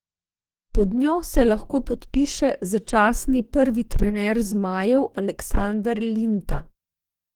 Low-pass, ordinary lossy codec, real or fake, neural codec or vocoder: 19.8 kHz; Opus, 24 kbps; fake; codec, 44.1 kHz, 2.6 kbps, DAC